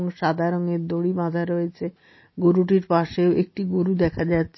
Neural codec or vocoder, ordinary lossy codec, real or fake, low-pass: none; MP3, 24 kbps; real; 7.2 kHz